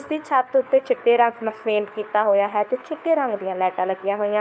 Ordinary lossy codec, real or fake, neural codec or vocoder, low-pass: none; fake; codec, 16 kHz, 4 kbps, FunCodec, trained on LibriTTS, 50 frames a second; none